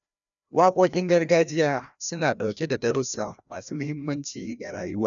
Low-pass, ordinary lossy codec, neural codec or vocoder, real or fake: 7.2 kHz; none; codec, 16 kHz, 1 kbps, FreqCodec, larger model; fake